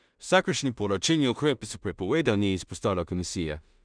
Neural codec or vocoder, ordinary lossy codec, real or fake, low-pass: codec, 16 kHz in and 24 kHz out, 0.4 kbps, LongCat-Audio-Codec, two codebook decoder; none; fake; 9.9 kHz